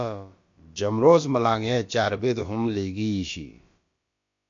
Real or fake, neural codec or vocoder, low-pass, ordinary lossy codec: fake; codec, 16 kHz, about 1 kbps, DyCAST, with the encoder's durations; 7.2 kHz; MP3, 48 kbps